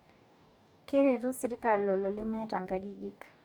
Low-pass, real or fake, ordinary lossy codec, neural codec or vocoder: 19.8 kHz; fake; none; codec, 44.1 kHz, 2.6 kbps, DAC